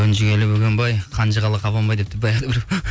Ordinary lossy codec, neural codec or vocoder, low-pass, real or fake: none; none; none; real